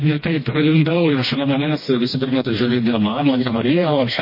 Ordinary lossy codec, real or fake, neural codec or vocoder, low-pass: MP3, 24 kbps; fake; codec, 16 kHz, 1 kbps, FreqCodec, smaller model; 5.4 kHz